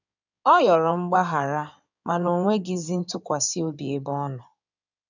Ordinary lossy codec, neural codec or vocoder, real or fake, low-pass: none; codec, 16 kHz in and 24 kHz out, 2.2 kbps, FireRedTTS-2 codec; fake; 7.2 kHz